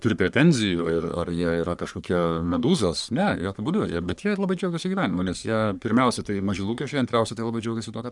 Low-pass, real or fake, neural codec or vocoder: 10.8 kHz; fake; codec, 44.1 kHz, 3.4 kbps, Pupu-Codec